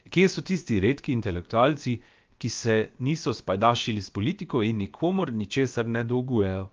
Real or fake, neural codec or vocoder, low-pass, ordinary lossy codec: fake; codec, 16 kHz, about 1 kbps, DyCAST, with the encoder's durations; 7.2 kHz; Opus, 24 kbps